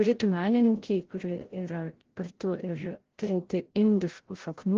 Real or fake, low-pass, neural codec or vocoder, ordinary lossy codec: fake; 7.2 kHz; codec, 16 kHz, 0.5 kbps, FreqCodec, larger model; Opus, 16 kbps